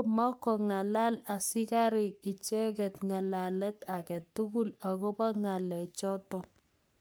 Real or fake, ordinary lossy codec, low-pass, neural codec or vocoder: fake; none; none; codec, 44.1 kHz, 3.4 kbps, Pupu-Codec